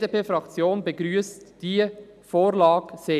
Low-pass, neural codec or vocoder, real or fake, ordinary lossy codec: 14.4 kHz; none; real; none